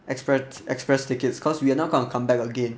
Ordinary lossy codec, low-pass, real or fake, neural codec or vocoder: none; none; real; none